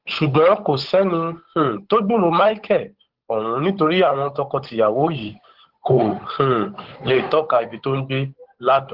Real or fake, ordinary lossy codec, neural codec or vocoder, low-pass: fake; Opus, 16 kbps; codec, 16 kHz, 8 kbps, FunCodec, trained on Chinese and English, 25 frames a second; 5.4 kHz